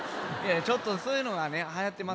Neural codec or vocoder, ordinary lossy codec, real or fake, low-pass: none; none; real; none